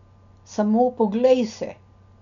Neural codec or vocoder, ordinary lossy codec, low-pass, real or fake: none; none; 7.2 kHz; real